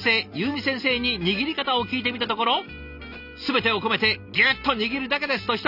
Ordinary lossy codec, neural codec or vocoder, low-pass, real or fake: none; none; 5.4 kHz; real